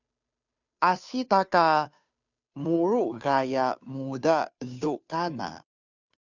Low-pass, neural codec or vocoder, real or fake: 7.2 kHz; codec, 16 kHz, 2 kbps, FunCodec, trained on Chinese and English, 25 frames a second; fake